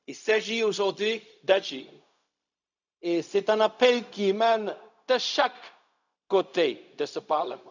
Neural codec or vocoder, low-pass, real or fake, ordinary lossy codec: codec, 16 kHz, 0.4 kbps, LongCat-Audio-Codec; 7.2 kHz; fake; none